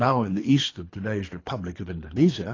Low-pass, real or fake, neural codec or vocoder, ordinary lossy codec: 7.2 kHz; fake; codec, 24 kHz, 3 kbps, HILCodec; AAC, 32 kbps